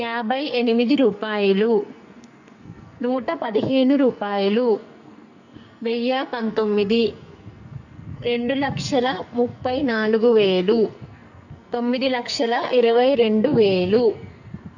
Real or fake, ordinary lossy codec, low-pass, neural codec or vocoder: fake; none; 7.2 kHz; codec, 44.1 kHz, 2.6 kbps, SNAC